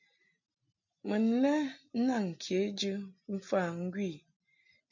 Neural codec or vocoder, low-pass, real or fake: none; 7.2 kHz; real